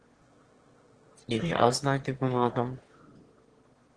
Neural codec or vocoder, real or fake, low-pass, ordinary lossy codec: autoencoder, 22.05 kHz, a latent of 192 numbers a frame, VITS, trained on one speaker; fake; 9.9 kHz; Opus, 16 kbps